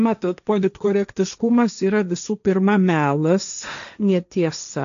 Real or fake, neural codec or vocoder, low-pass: fake; codec, 16 kHz, 1.1 kbps, Voila-Tokenizer; 7.2 kHz